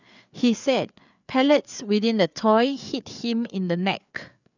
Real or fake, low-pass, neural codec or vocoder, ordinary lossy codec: fake; 7.2 kHz; codec, 16 kHz, 4 kbps, FreqCodec, larger model; none